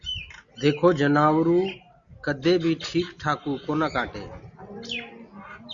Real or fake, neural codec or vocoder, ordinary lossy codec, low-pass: real; none; Opus, 64 kbps; 7.2 kHz